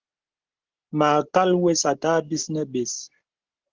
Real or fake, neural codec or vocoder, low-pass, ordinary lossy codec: real; none; 7.2 kHz; Opus, 16 kbps